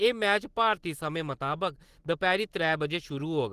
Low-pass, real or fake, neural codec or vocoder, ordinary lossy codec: 14.4 kHz; real; none; Opus, 16 kbps